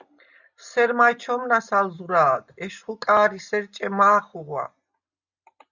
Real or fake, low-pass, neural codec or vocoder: real; 7.2 kHz; none